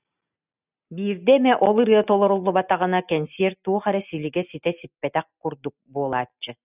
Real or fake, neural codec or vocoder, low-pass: real; none; 3.6 kHz